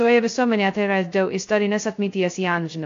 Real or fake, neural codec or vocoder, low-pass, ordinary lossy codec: fake; codec, 16 kHz, 0.2 kbps, FocalCodec; 7.2 kHz; AAC, 48 kbps